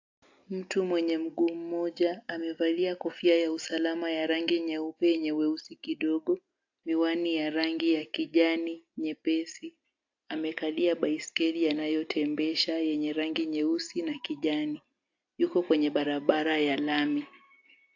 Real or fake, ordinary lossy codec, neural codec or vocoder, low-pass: real; AAC, 48 kbps; none; 7.2 kHz